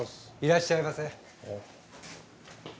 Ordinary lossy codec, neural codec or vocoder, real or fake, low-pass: none; none; real; none